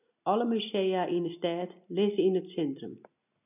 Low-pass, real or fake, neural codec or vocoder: 3.6 kHz; real; none